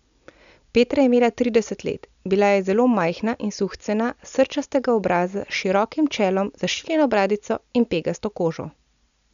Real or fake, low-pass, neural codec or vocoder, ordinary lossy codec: real; 7.2 kHz; none; none